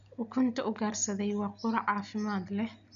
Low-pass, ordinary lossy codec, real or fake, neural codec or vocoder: 7.2 kHz; none; real; none